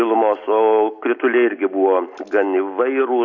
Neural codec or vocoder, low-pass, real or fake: none; 7.2 kHz; real